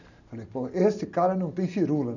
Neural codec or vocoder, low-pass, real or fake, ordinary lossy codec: none; 7.2 kHz; real; none